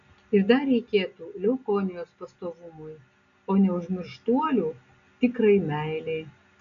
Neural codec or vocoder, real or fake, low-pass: none; real; 7.2 kHz